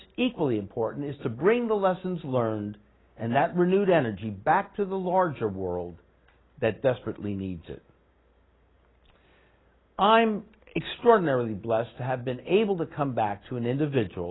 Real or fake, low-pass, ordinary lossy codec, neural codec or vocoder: real; 7.2 kHz; AAC, 16 kbps; none